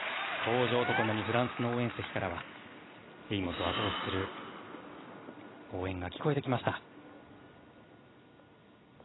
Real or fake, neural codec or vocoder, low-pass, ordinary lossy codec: real; none; 7.2 kHz; AAC, 16 kbps